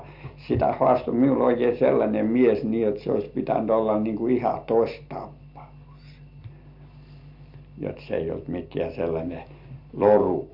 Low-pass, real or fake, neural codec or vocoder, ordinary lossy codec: 5.4 kHz; real; none; MP3, 48 kbps